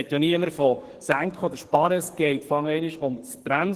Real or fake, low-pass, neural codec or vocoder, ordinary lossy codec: fake; 14.4 kHz; codec, 44.1 kHz, 2.6 kbps, SNAC; Opus, 16 kbps